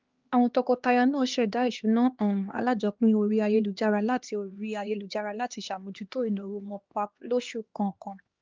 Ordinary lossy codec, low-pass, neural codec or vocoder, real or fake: Opus, 32 kbps; 7.2 kHz; codec, 16 kHz, 2 kbps, X-Codec, HuBERT features, trained on LibriSpeech; fake